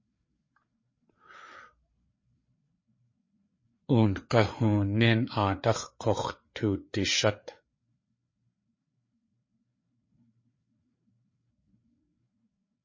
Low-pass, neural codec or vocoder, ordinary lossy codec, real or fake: 7.2 kHz; codec, 16 kHz, 4 kbps, FreqCodec, larger model; MP3, 32 kbps; fake